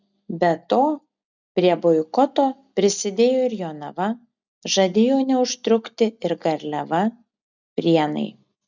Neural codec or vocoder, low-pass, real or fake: none; 7.2 kHz; real